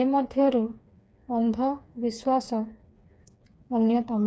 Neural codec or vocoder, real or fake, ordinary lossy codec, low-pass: codec, 16 kHz, 4 kbps, FreqCodec, smaller model; fake; none; none